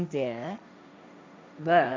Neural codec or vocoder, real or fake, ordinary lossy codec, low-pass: codec, 16 kHz, 1.1 kbps, Voila-Tokenizer; fake; none; none